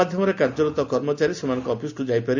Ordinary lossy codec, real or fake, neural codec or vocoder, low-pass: Opus, 64 kbps; real; none; 7.2 kHz